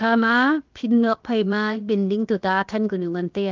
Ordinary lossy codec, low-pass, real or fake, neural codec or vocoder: Opus, 24 kbps; 7.2 kHz; fake; codec, 16 kHz, about 1 kbps, DyCAST, with the encoder's durations